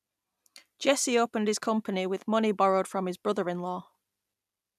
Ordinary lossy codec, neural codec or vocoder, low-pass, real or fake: none; none; 14.4 kHz; real